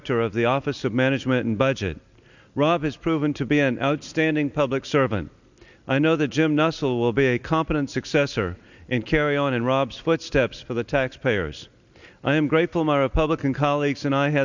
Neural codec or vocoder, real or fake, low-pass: none; real; 7.2 kHz